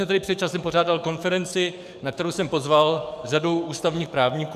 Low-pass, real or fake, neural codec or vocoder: 14.4 kHz; fake; codec, 44.1 kHz, 7.8 kbps, DAC